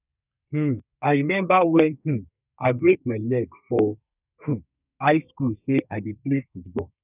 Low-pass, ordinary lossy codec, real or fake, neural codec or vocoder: 3.6 kHz; none; fake; codec, 32 kHz, 1.9 kbps, SNAC